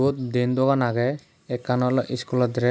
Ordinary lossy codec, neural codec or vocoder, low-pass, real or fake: none; none; none; real